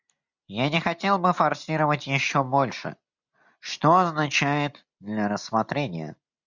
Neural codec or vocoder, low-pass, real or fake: none; 7.2 kHz; real